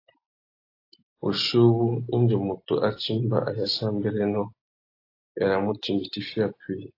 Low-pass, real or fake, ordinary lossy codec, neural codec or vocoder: 5.4 kHz; real; AAC, 32 kbps; none